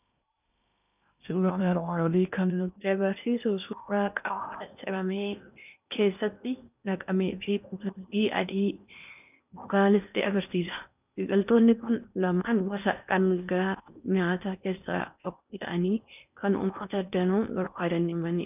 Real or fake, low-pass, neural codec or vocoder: fake; 3.6 kHz; codec, 16 kHz in and 24 kHz out, 0.8 kbps, FocalCodec, streaming, 65536 codes